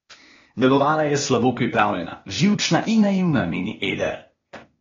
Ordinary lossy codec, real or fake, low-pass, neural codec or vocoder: AAC, 32 kbps; fake; 7.2 kHz; codec, 16 kHz, 0.8 kbps, ZipCodec